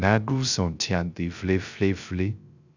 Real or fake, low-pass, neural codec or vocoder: fake; 7.2 kHz; codec, 16 kHz, 0.3 kbps, FocalCodec